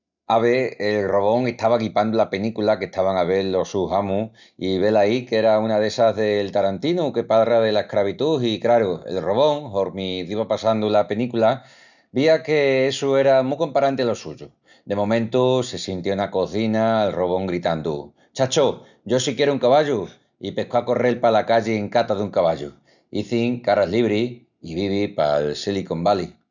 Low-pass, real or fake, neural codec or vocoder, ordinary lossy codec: 7.2 kHz; real; none; none